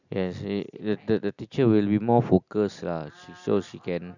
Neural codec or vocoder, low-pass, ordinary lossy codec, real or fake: none; 7.2 kHz; none; real